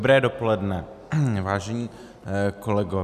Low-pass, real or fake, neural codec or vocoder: 14.4 kHz; real; none